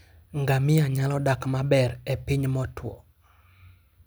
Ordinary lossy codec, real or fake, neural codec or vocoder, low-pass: none; real; none; none